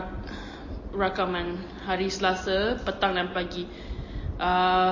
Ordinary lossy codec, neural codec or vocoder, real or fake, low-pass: MP3, 32 kbps; none; real; 7.2 kHz